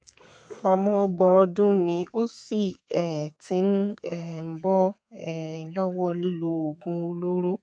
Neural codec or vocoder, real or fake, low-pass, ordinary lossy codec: codec, 32 kHz, 1.9 kbps, SNAC; fake; 9.9 kHz; AAC, 64 kbps